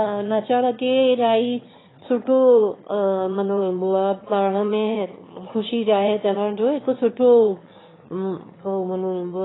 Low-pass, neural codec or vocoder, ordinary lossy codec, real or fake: 7.2 kHz; autoencoder, 22.05 kHz, a latent of 192 numbers a frame, VITS, trained on one speaker; AAC, 16 kbps; fake